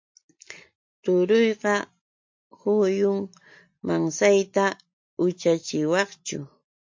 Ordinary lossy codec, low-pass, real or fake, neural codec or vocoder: MP3, 48 kbps; 7.2 kHz; real; none